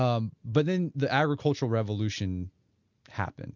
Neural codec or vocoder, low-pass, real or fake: none; 7.2 kHz; real